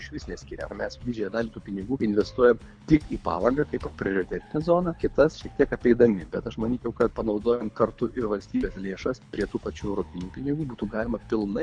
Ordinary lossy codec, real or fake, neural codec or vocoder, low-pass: MP3, 64 kbps; fake; codec, 24 kHz, 6 kbps, HILCodec; 9.9 kHz